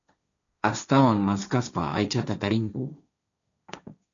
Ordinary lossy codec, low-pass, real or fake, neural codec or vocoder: MP3, 96 kbps; 7.2 kHz; fake; codec, 16 kHz, 1.1 kbps, Voila-Tokenizer